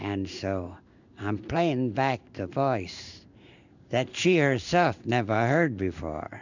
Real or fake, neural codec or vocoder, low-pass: fake; codec, 16 kHz in and 24 kHz out, 1 kbps, XY-Tokenizer; 7.2 kHz